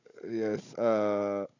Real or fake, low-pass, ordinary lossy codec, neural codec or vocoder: fake; 7.2 kHz; none; codec, 16 kHz, 16 kbps, FunCodec, trained on Chinese and English, 50 frames a second